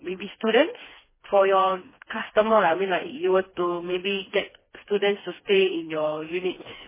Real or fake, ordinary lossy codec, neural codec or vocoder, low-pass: fake; MP3, 16 kbps; codec, 16 kHz, 2 kbps, FreqCodec, smaller model; 3.6 kHz